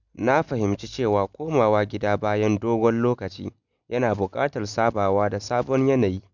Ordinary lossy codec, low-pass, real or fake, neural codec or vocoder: none; 7.2 kHz; real; none